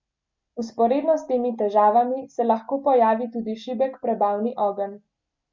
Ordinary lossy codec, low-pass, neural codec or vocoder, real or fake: MP3, 64 kbps; 7.2 kHz; none; real